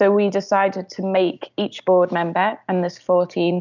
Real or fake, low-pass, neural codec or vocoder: real; 7.2 kHz; none